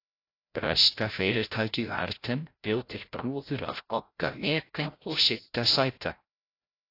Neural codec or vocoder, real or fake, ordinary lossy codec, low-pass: codec, 16 kHz, 0.5 kbps, FreqCodec, larger model; fake; AAC, 32 kbps; 5.4 kHz